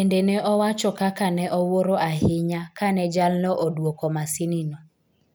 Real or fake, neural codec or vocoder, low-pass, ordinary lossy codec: real; none; none; none